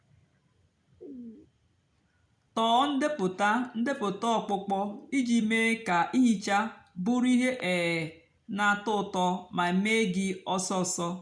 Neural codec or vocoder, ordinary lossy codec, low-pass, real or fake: none; none; 9.9 kHz; real